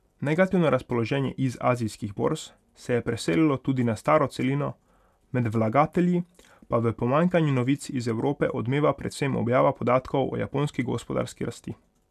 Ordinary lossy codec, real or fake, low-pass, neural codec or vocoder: none; real; 14.4 kHz; none